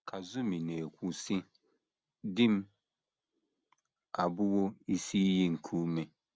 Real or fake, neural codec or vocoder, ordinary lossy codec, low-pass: real; none; none; none